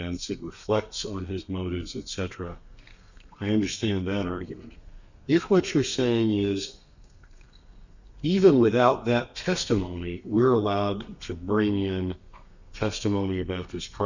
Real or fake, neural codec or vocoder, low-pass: fake; codec, 32 kHz, 1.9 kbps, SNAC; 7.2 kHz